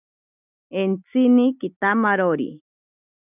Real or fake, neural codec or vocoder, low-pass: real; none; 3.6 kHz